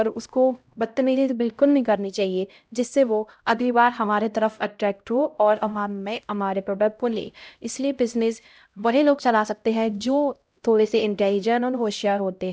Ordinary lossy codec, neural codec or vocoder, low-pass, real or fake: none; codec, 16 kHz, 0.5 kbps, X-Codec, HuBERT features, trained on LibriSpeech; none; fake